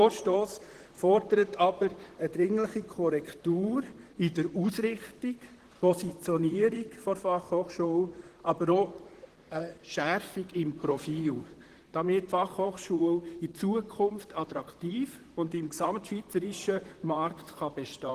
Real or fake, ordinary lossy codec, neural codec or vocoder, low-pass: fake; Opus, 16 kbps; vocoder, 44.1 kHz, 128 mel bands, Pupu-Vocoder; 14.4 kHz